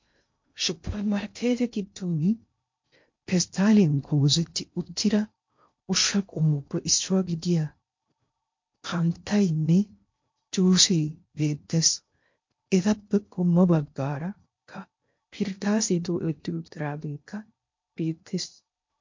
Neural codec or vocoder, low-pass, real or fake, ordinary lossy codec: codec, 16 kHz in and 24 kHz out, 0.6 kbps, FocalCodec, streaming, 2048 codes; 7.2 kHz; fake; MP3, 48 kbps